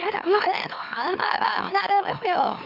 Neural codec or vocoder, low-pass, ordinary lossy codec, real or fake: autoencoder, 44.1 kHz, a latent of 192 numbers a frame, MeloTTS; 5.4 kHz; MP3, 48 kbps; fake